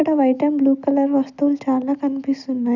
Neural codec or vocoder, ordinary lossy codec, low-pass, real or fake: none; none; 7.2 kHz; real